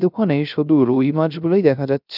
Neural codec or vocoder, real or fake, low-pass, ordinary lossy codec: codec, 16 kHz, 0.3 kbps, FocalCodec; fake; 5.4 kHz; none